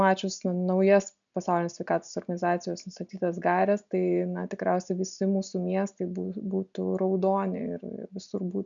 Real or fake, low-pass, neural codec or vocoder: real; 7.2 kHz; none